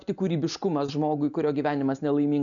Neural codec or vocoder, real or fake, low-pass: none; real; 7.2 kHz